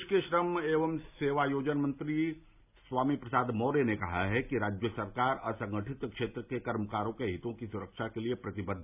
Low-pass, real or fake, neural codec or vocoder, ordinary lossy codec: 3.6 kHz; real; none; none